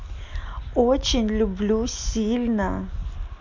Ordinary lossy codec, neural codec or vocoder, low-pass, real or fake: none; none; 7.2 kHz; real